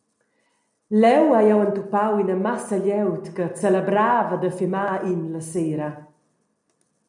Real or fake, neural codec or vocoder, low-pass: real; none; 10.8 kHz